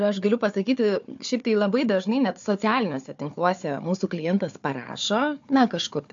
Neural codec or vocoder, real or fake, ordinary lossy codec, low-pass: codec, 16 kHz, 4 kbps, FunCodec, trained on Chinese and English, 50 frames a second; fake; AAC, 64 kbps; 7.2 kHz